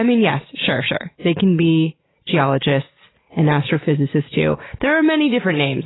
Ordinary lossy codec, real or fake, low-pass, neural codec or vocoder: AAC, 16 kbps; real; 7.2 kHz; none